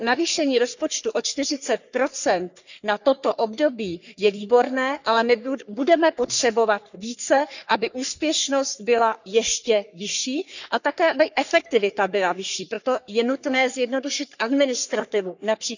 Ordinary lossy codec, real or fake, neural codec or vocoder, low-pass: none; fake; codec, 44.1 kHz, 3.4 kbps, Pupu-Codec; 7.2 kHz